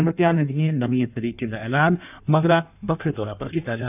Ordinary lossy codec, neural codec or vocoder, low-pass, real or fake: none; codec, 16 kHz in and 24 kHz out, 1.1 kbps, FireRedTTS-2 codec; 3.6 kHz; fake